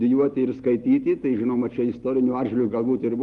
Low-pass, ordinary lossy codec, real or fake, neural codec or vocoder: 10.8 kHz; Opus, 24 kbps; real; none